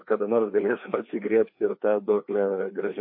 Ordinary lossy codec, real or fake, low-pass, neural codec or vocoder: MP3, 32 kbps; fake; 5.4 kHz; codec, 16 kHz, 2 kbps, FreqCodec, larger model